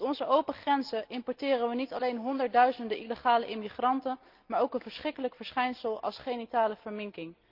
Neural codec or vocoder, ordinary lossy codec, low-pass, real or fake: none; Opus, 32 kbps; 5.4 kHz; real